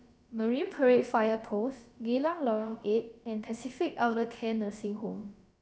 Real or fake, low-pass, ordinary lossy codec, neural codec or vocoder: fake; none; none; codec, 16 kHz, about 1 kbps, DyCAST, with the encoder's durations